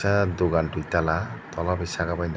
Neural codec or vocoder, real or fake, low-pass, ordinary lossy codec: none; real; none; none